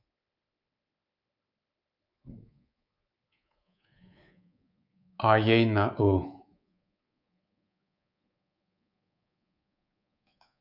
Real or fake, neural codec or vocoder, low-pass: fake; codec, 24 kHz, 3.1 kbps, DualCodec; 5.4 kHz